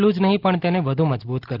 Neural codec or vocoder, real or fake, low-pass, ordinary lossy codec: none; real; 5.4 kHz; Opus, 16 kbps